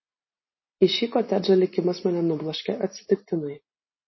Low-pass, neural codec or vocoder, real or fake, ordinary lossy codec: 7.2 kHz; none; real; MP3, 24 kbps